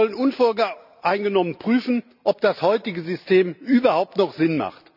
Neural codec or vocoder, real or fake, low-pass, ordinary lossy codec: none; real; 5.4 kHz; none